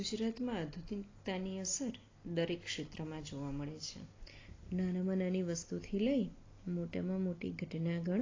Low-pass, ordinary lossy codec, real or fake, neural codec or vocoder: 7.2 kHz; AAC, 32 kbps; real; none